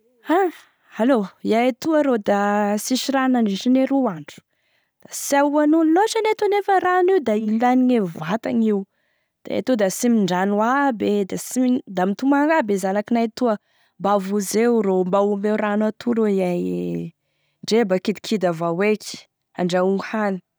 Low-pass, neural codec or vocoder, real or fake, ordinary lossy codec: none; none; real; none